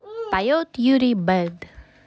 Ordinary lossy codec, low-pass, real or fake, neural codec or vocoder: none; none; real; none